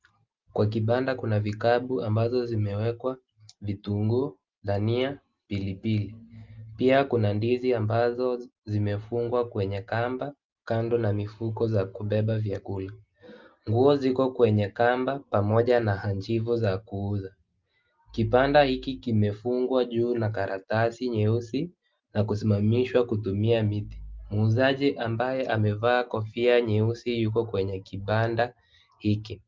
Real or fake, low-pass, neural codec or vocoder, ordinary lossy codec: real; 7.2 kHz; none; Opus, 32 kbps